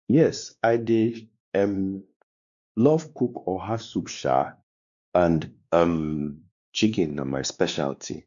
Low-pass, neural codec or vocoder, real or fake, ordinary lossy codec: 7.2 kHz; codec, 16 kHz, 2 kbps, X-Codec, WavLM features, trained on Multilingual LibriSpeech; fake; none